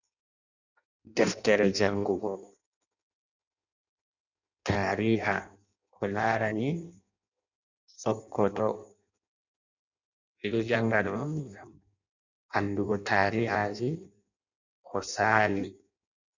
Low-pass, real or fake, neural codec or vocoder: 7.2 kHz; fake; codec, 16 kHz in and 24 kHz out, 0.6 kbps, FireRedTTS-2 codec